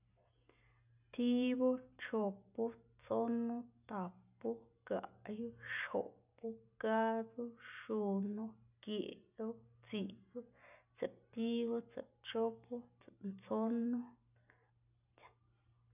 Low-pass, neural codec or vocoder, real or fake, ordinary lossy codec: 3.6 kHz; none; real; none